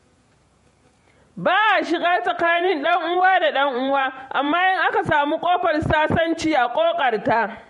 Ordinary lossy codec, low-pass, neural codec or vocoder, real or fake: MP3, 48 kbps; 14.4 kHz; vocoder, 48 kHz, 128 mel bands, Vocos; fake